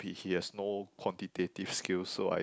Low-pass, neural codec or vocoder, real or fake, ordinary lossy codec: none; none; real; none